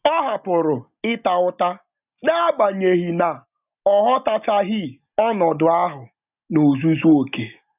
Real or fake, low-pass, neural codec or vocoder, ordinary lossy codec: real; 3.6 kHz; none; none